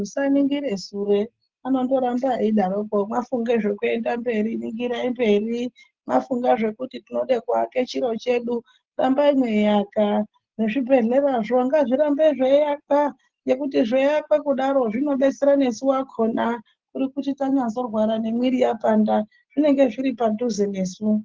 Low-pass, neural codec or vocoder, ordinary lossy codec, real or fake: 7.2 kHz; none; Opus, 16 kbps; real